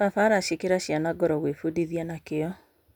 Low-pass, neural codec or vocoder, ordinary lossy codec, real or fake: 19.8 kHz; none; none; real